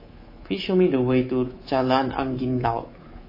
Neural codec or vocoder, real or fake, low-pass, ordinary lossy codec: codec, 24 kHz, 3.1 kbps, DualCodec; fake; 5.4 kHz; MP3, 24 kbps